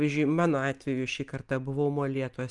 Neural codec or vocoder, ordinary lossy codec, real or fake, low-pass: none; Opus, 32 kbps; real; 10.8 kHz